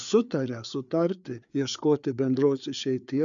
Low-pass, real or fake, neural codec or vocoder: 7.2 kHz; fake; codec, 16 kHz, 4 kbps, FreqCodec, larger model